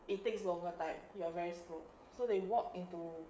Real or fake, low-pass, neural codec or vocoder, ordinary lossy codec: fake; none; codec, 16 kHz, 16 kbps, FreqCodec, smaller model; none